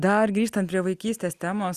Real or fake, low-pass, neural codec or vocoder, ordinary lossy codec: real; 14.4 kHz; none; Opus, 64 kbps